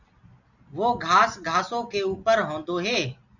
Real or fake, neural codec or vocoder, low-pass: real; none; 7.2 kHz